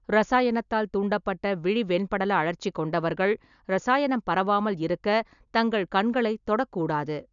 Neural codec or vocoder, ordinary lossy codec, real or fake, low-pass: none; none; real; 7.2 kHz